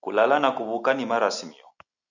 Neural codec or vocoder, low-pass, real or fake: none; 7.2 kHz; real